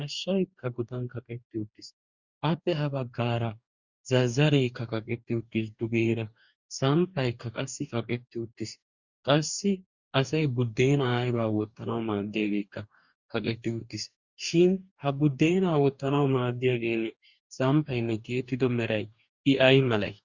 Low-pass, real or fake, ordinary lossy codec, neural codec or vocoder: 7.2 kHz; fake; Opus, 64 kbps; codec, 44.1 kHz, 2.6 kbps, DAC